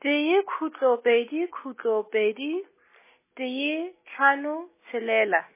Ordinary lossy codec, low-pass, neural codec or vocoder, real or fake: MP3, 16 kbps; 3.6 kHz; none; real